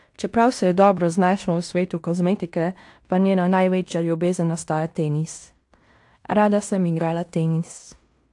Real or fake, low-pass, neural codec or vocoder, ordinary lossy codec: fake; 10.8 kHz; codec, 16 kHz in and 24 kHz out, 0.9 kbps, LongCat-Audio-Codec, fine tuned four codebook decoder; AAC, 64 kbps